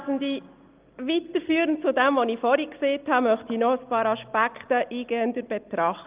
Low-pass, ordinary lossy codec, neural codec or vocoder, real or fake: 3.6 kHz; Opus, 32 kbps; none; real